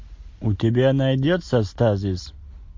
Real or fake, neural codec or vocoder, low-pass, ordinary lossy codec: real; none; 7.2 kHz; MP3, 48 kbps